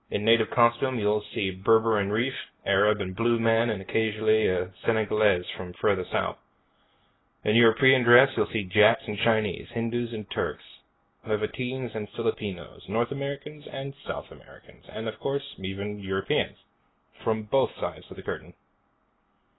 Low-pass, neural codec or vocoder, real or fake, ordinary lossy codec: 7.2 kHz; none; real; AAC, 16 kbps